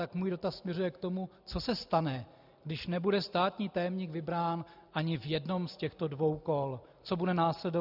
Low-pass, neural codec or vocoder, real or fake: 5.4 kHz; none; real